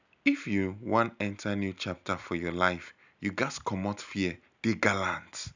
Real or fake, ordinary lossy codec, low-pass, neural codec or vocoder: real; none; 7.2 kHz; none